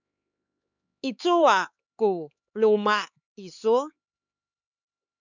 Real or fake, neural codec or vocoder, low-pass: fake; codec, 16 kHz, 4 kbps, X-Codec, HuBERT features, trained on LibriSpeech; 7.2 kHz